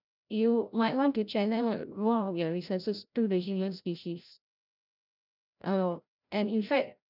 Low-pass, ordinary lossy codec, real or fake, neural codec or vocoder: 5.4 kHz; none; fake; codec, 16 kHz, 0.5 kbps, FreqCodec, larger model